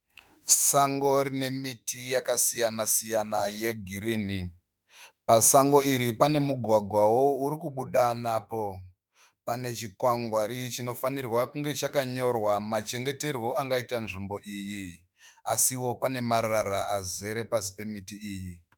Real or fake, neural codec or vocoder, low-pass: fake; autoencoder, 48 kHz, 32 numbers a frame, DAC-VAE, trained on Japanese speech; 19.8 kHz